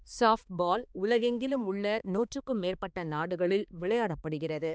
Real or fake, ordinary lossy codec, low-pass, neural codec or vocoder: fake; none; none; codec, 16 kHz, 2 kbps, X-Codec, HuBERT features, trained on balanced general audio